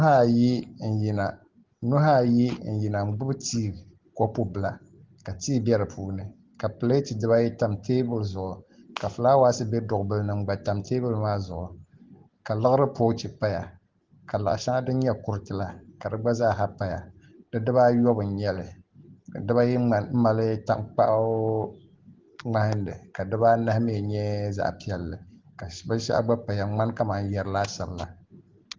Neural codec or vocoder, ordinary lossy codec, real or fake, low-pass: none; Opus, 16 kbps; real; 7.2 kHz